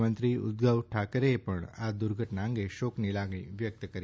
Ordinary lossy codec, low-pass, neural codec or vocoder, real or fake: none; none; none; real